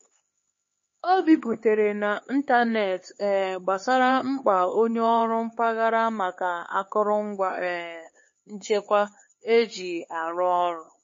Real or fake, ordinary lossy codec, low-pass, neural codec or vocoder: fake; MP3, 32 kbps; 7.2 kHz; codec, 16 kHz, 4 kbps, X-Codec, HuBERT features, trained on LibriSpeech